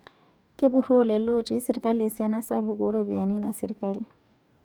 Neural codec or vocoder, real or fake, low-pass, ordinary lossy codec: codec, 44.1 kHz, 2.6 kbps, DAC; fake; none; none